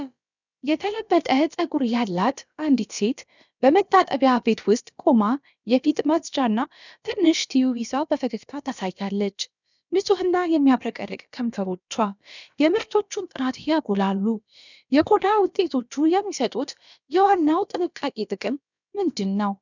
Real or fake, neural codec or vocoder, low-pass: fake; codec, 16 kHz, about 1 kbps, DyCAST, with the encoder's durations; 7.2 kHz